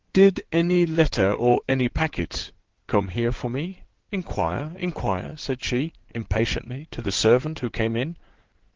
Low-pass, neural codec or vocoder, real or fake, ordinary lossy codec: 7.2 kHz; codec, 16 kHz in and 24 kHz out, 2.2 kbps, FireRedTTS-2 codec; fake; Opus, 16 kbps